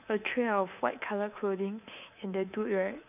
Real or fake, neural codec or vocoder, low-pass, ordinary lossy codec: fake; codec, 24 kHz, 3.1 kbps, DualCodec; 3.6 kHz; none